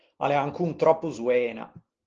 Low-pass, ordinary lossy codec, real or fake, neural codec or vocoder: 7.2 kHz; Opus, 16 kbps; real; none